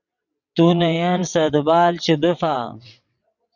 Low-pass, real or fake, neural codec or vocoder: 7.2 kHz; fake; vocoder, 22.05 kHz, 80 mel bands, WaveNeXt